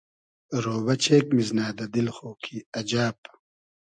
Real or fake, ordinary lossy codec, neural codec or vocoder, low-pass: real; MP3, 96 kbps; none; 9.9 kHz